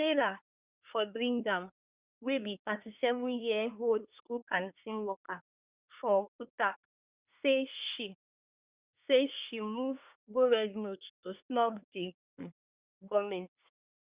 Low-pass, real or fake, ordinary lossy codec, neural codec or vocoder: 3.6 kHz; fake; Opus, 64 kbps; codec, 24 kHz, 1 kbps, SNAC